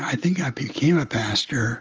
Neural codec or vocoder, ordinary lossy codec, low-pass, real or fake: none; Opus, 24 kbps; 7.2 kHz; real